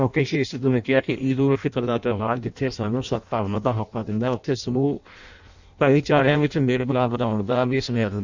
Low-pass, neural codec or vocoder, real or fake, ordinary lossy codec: 7.2 kHz; codec, 16 kHz in and 24 kHz out, 0.6 kbps, FireRedTTS-2 codec; fake; none